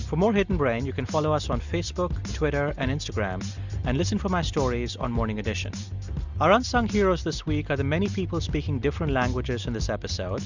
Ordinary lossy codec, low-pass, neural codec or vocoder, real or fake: Opus, 64 kbps; 7.2 kHz; none; real